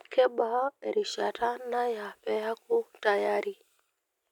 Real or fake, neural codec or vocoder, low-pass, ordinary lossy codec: real; none; 19.8 kHz; none